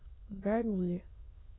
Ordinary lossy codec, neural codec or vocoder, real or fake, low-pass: AAC, 16 kbps; autoencoder, 22.05 kHz, a latent of 192 numbers a frame, VITS, trained on many speakers; fake; 7.2 kHz